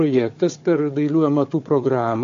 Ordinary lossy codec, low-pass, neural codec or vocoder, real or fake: AAC, 48 kbps; 7.2 kHz; codec, 16 kHz, 4 kbps, FunCodec, trained on Chinese and English, 50 frames a second; fake